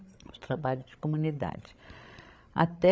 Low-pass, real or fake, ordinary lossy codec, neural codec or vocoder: none; fake; none; codec, 16 kHz, 16 kbps, FreqCodec, larger model